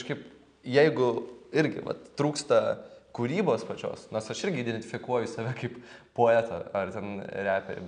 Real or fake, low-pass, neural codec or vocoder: real; 9.9 kHz; none